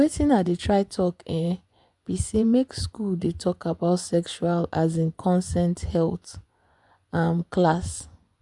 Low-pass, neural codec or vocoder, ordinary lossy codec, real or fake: 10.8 kHz; vocoder, 24 kHz, 100 mel bands, Vocos; none; fake